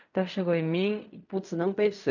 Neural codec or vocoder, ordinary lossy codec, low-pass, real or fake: codec, 16 kHz in and 24 kHz out, 0.4 kbps, LongCat-Audio-Codec, fine tuned four codebook decoder; none; 7.2 kHz; fake